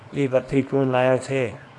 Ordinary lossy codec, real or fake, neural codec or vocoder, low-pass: AAC, 48 kbps; fake; codec, 24 kHz, 0.9 kbps, WavTokenizer, small release; 10.8 kHz